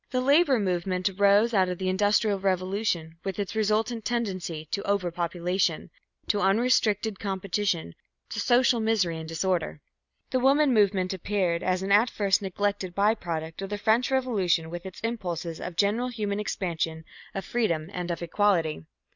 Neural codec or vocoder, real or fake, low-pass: none; real; 7.2 kHz